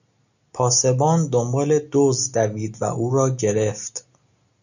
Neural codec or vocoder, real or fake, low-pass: none; real; 7.2 kHz